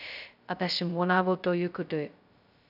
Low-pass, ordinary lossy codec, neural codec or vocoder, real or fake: 5.4 kHz; none; codec, 16 kHz, 0.2 kbps, FocalCodec; fake